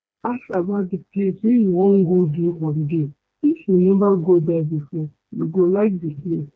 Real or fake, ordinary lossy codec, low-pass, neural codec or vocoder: fake; none; none; codec, 16 kHz, 2 kbps, FreqCodec, smaller model